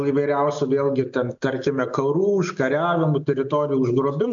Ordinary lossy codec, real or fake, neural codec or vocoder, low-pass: AAC, 64 kbps; real; none; 7.2 kHz